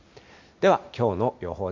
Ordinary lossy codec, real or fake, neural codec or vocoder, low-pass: MP3, 48 kbps; real; none; 7.2 kHz